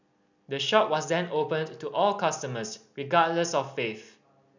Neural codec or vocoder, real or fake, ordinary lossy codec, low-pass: none; real; none; 7.2 kHz